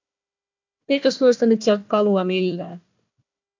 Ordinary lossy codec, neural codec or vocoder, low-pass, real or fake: MP3, 64 kbps; codec, 16 kHz, 1 kbps, FunCodec, trained on Chinese and English, 50 frames a second; 7.2 kHz; fake